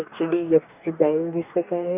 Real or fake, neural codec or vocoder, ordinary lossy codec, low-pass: fake; codec, 44.1 kHz, 2.6 kbps, DAC; none; 3.6 kHz